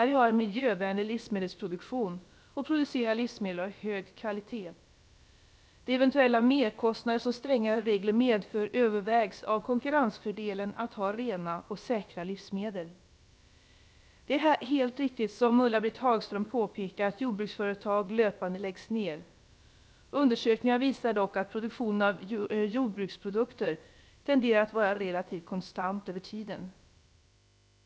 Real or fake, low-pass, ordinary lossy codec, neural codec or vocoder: fake; none; none; codec, 16 kHz, about 1 kbps, DyCAST, with the encoder's durations